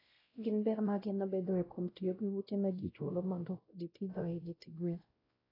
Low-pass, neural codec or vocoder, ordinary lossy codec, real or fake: 5.4 kHz; codec, 16 kHz, 0.5 kbps, X-Codec, WavLM features, trained on Multilingual LibriSpeech; AAC, 24 kbps; fake